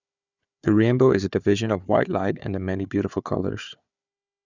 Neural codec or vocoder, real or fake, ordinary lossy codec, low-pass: codec, 16 kHz, 4 kbps, FunCodec, trained on Chinese and English, 50 frames a second; fake; none; 7.2 kHz